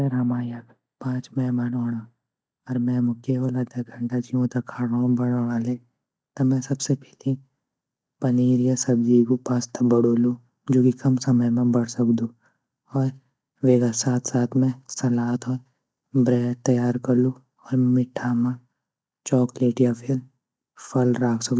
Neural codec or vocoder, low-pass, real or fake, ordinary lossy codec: none; none; real; none